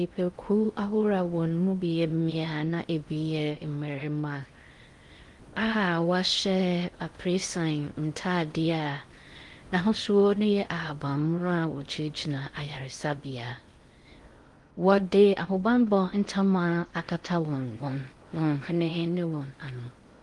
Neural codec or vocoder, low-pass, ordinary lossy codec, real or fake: codec, 16 kHz in and 24 kHz out, 0.6 kbps, FocalCodec, streaming, 2048 codes; 10.8 kHz; Opus, 24 kbps; fake